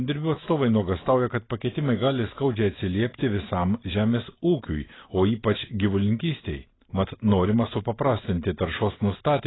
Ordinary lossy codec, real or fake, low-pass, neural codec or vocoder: AAC, 16 kbps; real; 7.2 kHz; none